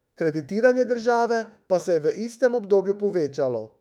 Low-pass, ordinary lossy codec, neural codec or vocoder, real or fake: 19.8 kHz; none; autoencoder, 48 kHz, 32 numbers a frame, DAC-VAE, trained on Japanese speech; fake